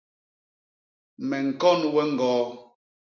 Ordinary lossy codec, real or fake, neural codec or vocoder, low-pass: MP3, 48 kbps; real; none; 7.2 kHz